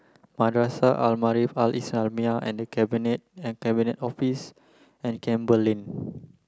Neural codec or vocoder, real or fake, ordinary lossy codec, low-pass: none; real; none; none